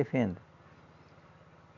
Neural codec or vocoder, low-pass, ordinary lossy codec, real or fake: none; 7.2 kHz; none; real